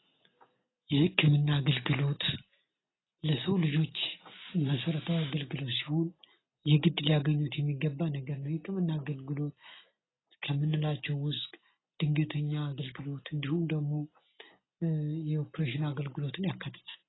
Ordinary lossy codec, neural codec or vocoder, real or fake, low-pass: AAC, 16 kbps; none; real; 7.2 kHz